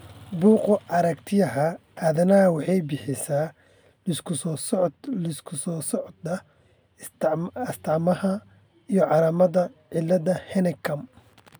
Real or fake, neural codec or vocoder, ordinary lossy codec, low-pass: real; none; none; none